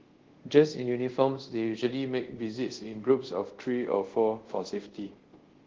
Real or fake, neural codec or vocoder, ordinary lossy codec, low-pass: fake; codec, 24 kHz, 0.5 kbps, DualCodec; Opus, 16 kbps; 7.2 kHz